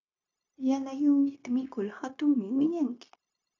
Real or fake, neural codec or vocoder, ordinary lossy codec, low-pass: fake; codec, 16 kHz, 0.9 kbps, LongCat-Audio-Codec; AAC, 48 kbps; 7.2 kHz